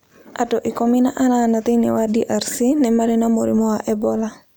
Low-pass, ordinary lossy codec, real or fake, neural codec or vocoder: none; none; real; none